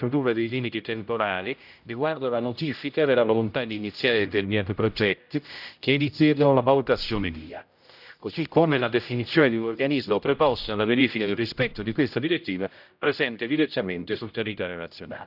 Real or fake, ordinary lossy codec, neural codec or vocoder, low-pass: fake; none; codec, 16 kHz, 0.5 kbps, X-Codec, HuBERT features, trained on general audio; 5.4 kHz